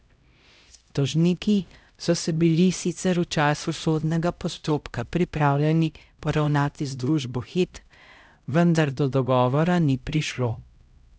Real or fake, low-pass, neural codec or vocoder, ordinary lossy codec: fake; none; codec, 16 kHz, 0.5 kbps, X-Codec, HuBERT features, trained on LibriSpeech; none